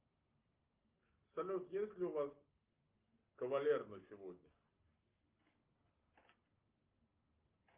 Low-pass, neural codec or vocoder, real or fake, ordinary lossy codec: 3.6 kHz; none; real; Opus, 32 kbps